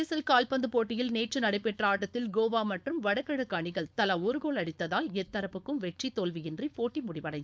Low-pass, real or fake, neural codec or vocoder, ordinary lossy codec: none; fake; codec, 16 kHz, 4.8 kbps, FACodec; none